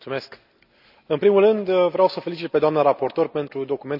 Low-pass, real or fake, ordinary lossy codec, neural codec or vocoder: 5.4 kHz; real; none; none